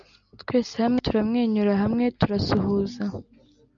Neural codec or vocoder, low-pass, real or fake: none; 7.2 kHz; real